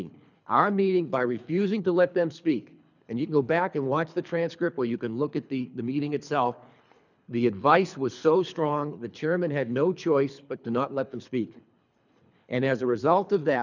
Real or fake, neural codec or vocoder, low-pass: fake; codec, 24 kHz, 3 kbps, HILCodec; 7.2 kHz